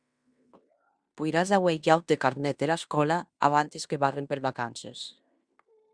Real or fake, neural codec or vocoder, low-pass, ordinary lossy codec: fake; codec, 16 kHz in and 24 kHz out, 0.9 kbps, LongCat-Audio-Codec, fine tuned four codebook decoder; 9.9 kHz; Opus, 64 kbps